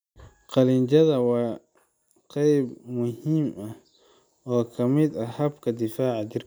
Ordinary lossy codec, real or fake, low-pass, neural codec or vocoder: none; real; none; none